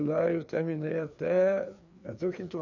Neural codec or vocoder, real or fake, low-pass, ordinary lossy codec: codec, 16 kHz, 2 kbps, FreqCodec, larger model; fake; 7.2 kHz; none